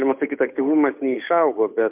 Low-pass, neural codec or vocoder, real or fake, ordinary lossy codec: 3.6 kHz; codec, 16 kHz, 8 kbps, FunCodec, trained on Chinese and English, 25 frames a second; fake; MP3, 32 kbps